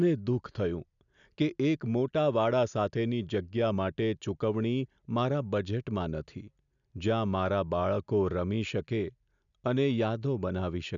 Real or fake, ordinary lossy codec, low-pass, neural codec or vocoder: real; none; 7.2 kHz; none